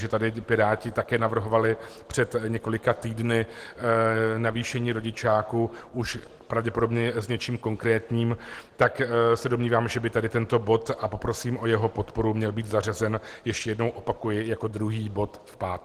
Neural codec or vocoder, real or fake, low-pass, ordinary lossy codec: none; real; 14.4 kHz; Opus, 16 kbps